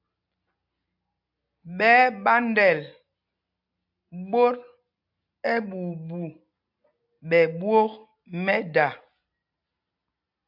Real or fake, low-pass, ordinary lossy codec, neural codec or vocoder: real; 5.4 kHz; AAC, 48 kbps; none